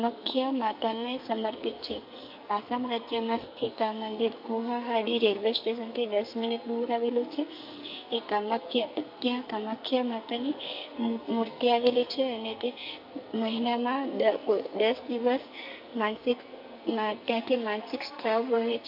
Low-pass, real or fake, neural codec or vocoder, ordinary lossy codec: 5.4 kHz; fake; codec, 44.1 kHz, 2.6 kbps, SNAC; MP3, 48 kbps